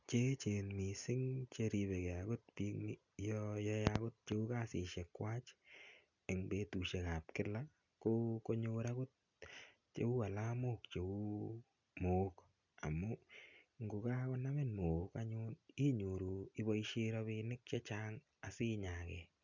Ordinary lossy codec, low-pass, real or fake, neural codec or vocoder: none; 7.2 kHz; real; none